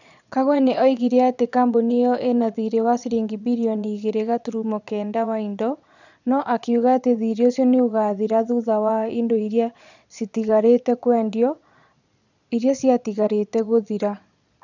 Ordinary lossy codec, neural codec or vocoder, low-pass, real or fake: none; vocoder, 22.05 kHz, 80 mel bands, Vocos; 7.2 kHz; fake